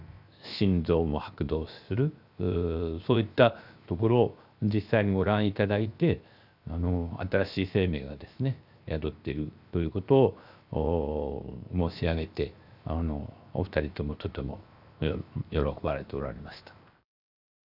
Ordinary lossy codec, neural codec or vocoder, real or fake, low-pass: none; codec, 16 kHz, 0.7 kbps, FocalCodec; fake; 5.4 kHz